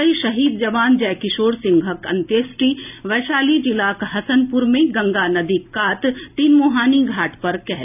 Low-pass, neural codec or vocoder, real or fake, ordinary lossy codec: 3.6 kHz; none; real; none